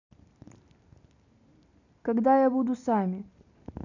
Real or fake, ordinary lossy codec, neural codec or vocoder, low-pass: real; none; none; 7.2 kHz